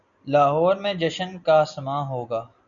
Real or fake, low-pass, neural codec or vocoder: real; 7.2 kHz; none